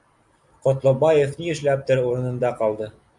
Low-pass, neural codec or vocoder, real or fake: 10.8 kHz; none; real